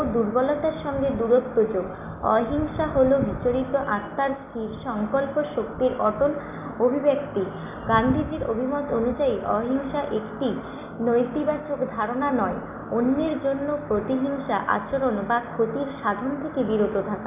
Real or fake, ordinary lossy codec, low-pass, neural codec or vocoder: real; none; 3.6 kHz; none